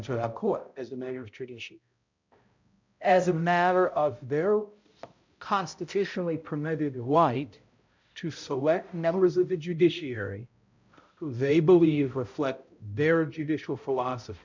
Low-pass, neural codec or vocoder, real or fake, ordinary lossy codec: 7.2 kHz; codec, 16 kHz, 0.5 kbps, X-Codec, HuBERT features, trained on balanced general audio; fake; MP3, 64 kbps